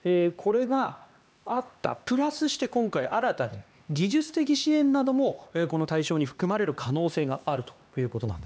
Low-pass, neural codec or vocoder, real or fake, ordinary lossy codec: none; codec, 16 kHz, 2 kbps, X-Codec, HuBERT features, trained on LibriSpeech; fake; none